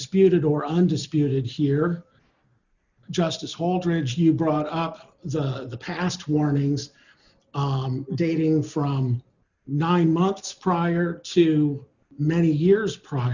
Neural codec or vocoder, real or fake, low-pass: none; real; 7.2 kHz